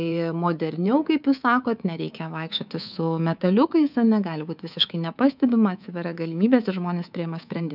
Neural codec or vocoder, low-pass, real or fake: codec, 24 kHz, 3.1 kbps, DualCodec; 5.4 kHz; fake